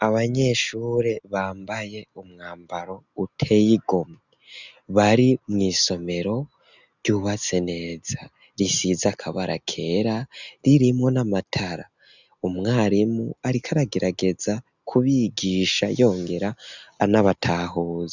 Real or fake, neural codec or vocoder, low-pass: real; none; 7.2 kHz